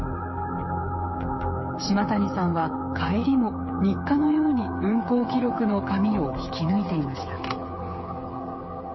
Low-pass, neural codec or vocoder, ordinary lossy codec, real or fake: 7.2 kHz; codec, 16 kHz, 8 kbps, FreqCodec, smaller model; MP3, 24 kbps; fake